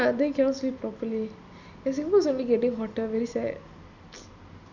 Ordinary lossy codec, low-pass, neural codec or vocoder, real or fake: none; 7.2 kHz; none; real